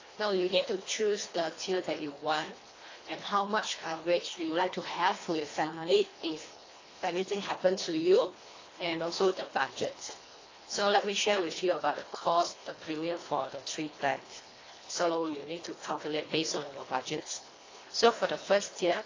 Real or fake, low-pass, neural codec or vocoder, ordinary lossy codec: fake; 7.2 kHz; codec, 24 kHz, 1.5 kbps, HILCodec; AAC, 32 kbps